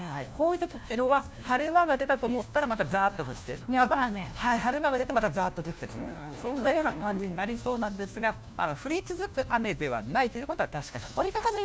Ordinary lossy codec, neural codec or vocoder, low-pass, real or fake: none; codec, 16 kHz, 1 kbps, FunCodec, trained on LibriTTS, 50 frames a second; none; fake